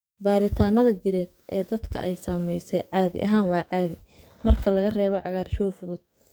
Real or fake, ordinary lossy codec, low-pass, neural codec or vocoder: fake; none; none; codec, 44.1 kHz, 2.6 kbps, SNAC